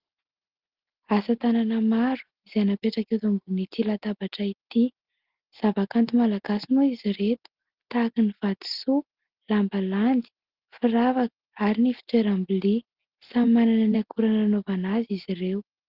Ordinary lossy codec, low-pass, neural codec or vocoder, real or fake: Opus, 16 kbps; 5.4 kHz; none; real